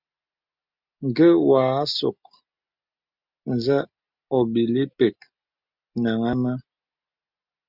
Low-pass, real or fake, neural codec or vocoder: 5.4 kHz; real; none